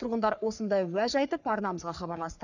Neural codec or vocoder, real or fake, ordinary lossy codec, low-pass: codec, 44.1 kHz, 3.4 kbps, Pupu-Codec; fake; none; 7.2 kHz